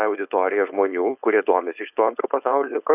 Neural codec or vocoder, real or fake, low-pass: codec, 16 kHz, 4.8 kbps, FACodec; fake; 3.6 kHz